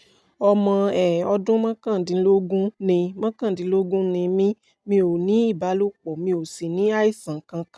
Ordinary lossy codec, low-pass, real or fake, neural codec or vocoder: none; none; real; none